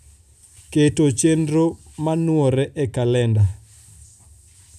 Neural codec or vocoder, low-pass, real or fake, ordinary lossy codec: none; 14.4 kHz; real; none